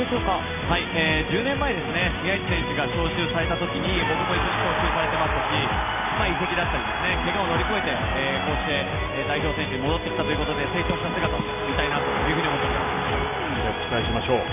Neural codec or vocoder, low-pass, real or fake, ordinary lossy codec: none; 3.6 kHz; real; none